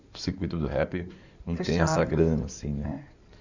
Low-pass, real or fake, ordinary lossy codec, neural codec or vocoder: 7.2 kHz; fake; none; codec, 16 kHz in and 24 kHz out, 2.2 kbps, FireRedTTS-2 codec